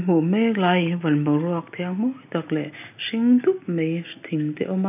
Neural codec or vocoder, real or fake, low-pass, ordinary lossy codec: none; real; 3.6 kHz; none